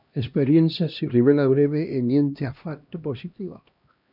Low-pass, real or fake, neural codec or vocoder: 5.4 kHz; fake; codec, 16 kHz, 1 kbps, X-Codec, HuBERT features, trained on LibriSpeech